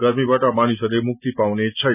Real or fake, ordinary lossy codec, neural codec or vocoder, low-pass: real; none; none; 3.6 kHz